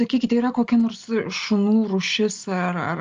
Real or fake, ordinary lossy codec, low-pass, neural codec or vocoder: real; Opus, 32 kbps; 7.2 kHz; none